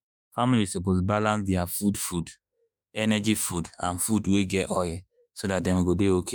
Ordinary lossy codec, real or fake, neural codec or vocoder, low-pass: none; fake; autoencoder, 48 kHz, 32 numbers a frame, DAC-VAE, trained on Japanese speech; none